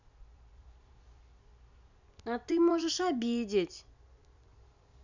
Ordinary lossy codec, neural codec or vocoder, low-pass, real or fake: none; vocoder, 44.1 kHz, 128 mel bands every 256 samples, BigVGAN v2; 7.2 kHz; fake